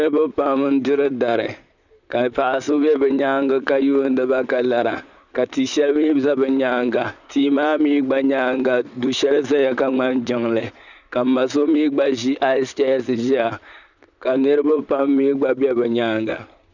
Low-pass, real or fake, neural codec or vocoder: 7.2 kHz; fake; vocoder, 44.1 kHz, 128 mel bands, Pupu-Vocoder